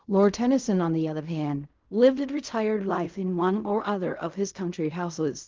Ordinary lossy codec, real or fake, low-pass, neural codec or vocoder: Opus, 24 kbps; fake; 7.2 kHz; codec, 16 kHz in and 24 kHz out, 0.4 kbps, LongCat-Audio-Codec, fine tuned four codebook decoder